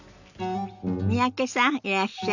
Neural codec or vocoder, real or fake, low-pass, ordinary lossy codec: none; real; 7.2 kHz; none